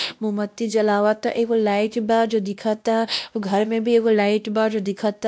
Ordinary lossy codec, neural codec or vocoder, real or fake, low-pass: none; codec, 16 kHz, 1 kbps, X-Codec, WavLM features, trained on Multilingual LibriSpeech; fake; none